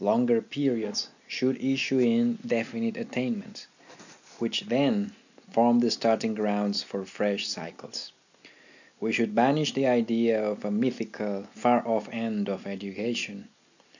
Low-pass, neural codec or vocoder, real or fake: 7.2 kHz; none; real